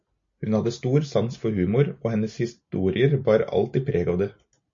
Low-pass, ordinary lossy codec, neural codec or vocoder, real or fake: 7.2 kHz; MP3, 48 kbps; none; real